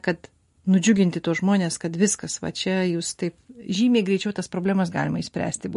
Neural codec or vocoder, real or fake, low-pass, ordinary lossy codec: none; real; 10.8 kHz; MP3, 48 kbps